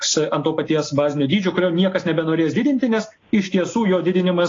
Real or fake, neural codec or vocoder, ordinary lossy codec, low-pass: real; none; AAC, 32 kbps; 7.2 kHz